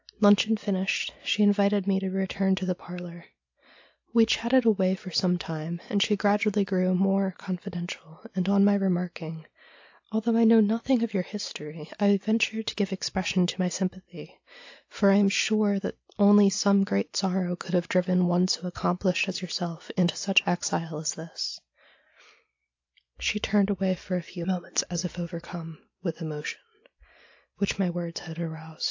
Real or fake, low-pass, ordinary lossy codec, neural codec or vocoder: real; 7.2 kHz; AAC, 48 kbps; none